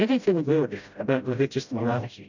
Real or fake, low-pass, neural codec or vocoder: fake; 7.2 kHz; codec, 16 kHz, 0.5 kbps, FreqCodec, smaller model